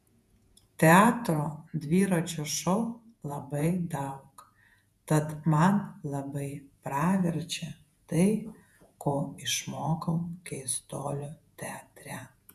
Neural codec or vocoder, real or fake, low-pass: vocoder, 48 kHz, 128 mel bands, Vocos; fake; 14.4 kHz